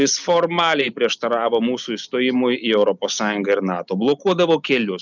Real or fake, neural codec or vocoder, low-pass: real; none; 7.2 kHz